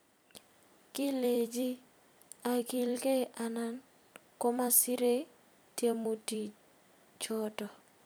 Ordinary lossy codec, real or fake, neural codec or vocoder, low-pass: none; fake; vocoder, 44.1 kHz, 128 mel bands every 256 samples, BigVGAN v2; none